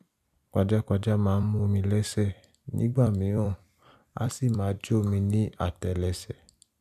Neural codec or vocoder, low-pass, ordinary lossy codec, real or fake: vocoder, 44.1 kHz, 128 mel bands every 256 samples, BigVGAN v2; 14.4 kHz; none; fake